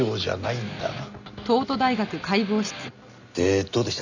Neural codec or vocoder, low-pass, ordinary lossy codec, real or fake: none; 7.2 kHz; none; real